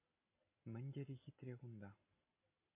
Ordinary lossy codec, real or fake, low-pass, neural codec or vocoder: MP3, 24 kbps; real; 3.6 kHz; none